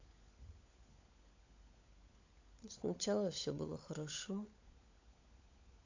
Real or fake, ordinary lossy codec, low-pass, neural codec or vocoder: fake; AAC, 48 kbps; 7.2 kHz; codec, 16 kHz, 16 kbps, FunCodec, trained on LibriTTS, 50 frames a second